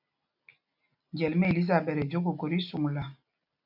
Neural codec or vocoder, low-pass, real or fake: none; 5.4 kHz; real